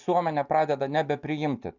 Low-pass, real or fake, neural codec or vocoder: 7.2 kHz; real; none